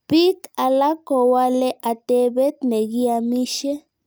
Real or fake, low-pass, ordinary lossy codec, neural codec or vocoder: real; none; none; none